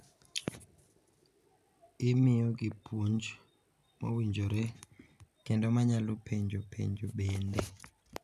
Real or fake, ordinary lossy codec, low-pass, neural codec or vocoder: real; none; 14.4 kHz; none